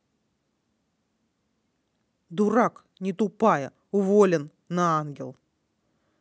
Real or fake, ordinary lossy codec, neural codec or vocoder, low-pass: real; none; none; none